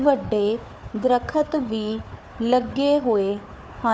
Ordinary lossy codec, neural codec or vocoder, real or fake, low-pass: none; codec, 16 kHz, 4 kbps, FunCodec, trained on Chinese and English, 50 frames a second; fake; none